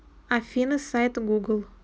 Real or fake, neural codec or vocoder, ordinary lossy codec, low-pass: real; none; none; none